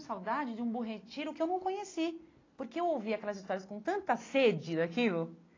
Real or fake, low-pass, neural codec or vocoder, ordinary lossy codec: real; 7.2 kHz; none; AAC, 32 kbps